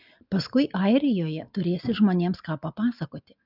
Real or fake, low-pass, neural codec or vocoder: real; 5.4 kHz; none